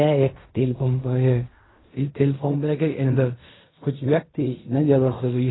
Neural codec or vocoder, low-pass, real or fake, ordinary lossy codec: codec, 16 kHz in and 24 kHz out, 0.4 kbps, LongCat-Audio-Codec, fine tuned four codebook decoder; 7.2 kHz; fake; AAC, 16 kbps